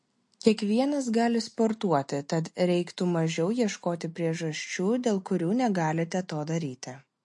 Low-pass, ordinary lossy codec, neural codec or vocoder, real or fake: 10.8 kHz; MP3, 48 kbps; none; real